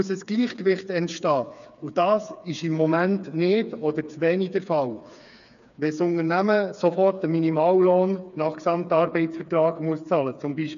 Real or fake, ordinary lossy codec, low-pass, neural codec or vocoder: fake; none; 7.2 kHz; codec, 16 kHz, 4 kbps, FreqCodec, smaller model